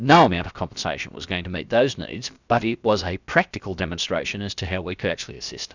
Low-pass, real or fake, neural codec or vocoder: 7.2 kHz; fake; codec, 16 kHz, about 1 kbps, DyCAST, with the encoder's durations